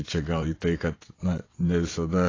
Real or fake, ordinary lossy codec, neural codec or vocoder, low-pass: fake; AAC, 32 kbps; vocoder, 22.05 kHz, 80 mel bands, WaveNeXt; 7.2 kHz